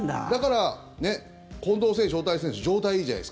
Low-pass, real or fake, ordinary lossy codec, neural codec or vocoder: none; real; none; none